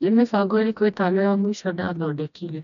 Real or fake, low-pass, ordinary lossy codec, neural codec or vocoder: fake; 7.2 kHz; none; codec, 16 kHz, 1 kbps, FreqCodec, smaller model